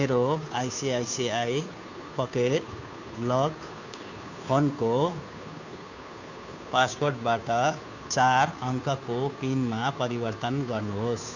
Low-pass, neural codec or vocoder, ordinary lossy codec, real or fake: 7.2 kHz; codec, 16 kHz, 2 kbps, FunCodec, trained on Chinese and English, 25 frames a second; none; fake